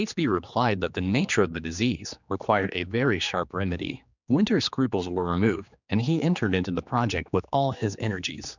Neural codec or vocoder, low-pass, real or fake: codec, 16 kHz, 2 kbps, X-Codec, HuBERT features, trained on general audio; 7.2 kHz; fake